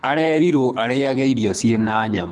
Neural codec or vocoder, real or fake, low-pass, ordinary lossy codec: codec, 24 kHz, 3 kbps, HILCodec; fake; none; none